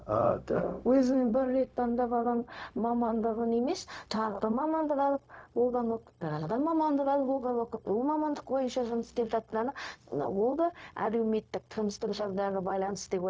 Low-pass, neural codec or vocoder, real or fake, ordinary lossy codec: none; codec, 16 kHz, 0.4 kbps, LongCat-Audio-Codec; fake; none